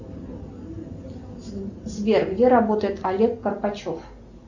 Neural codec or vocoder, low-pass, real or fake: none; 7.2 kHz; real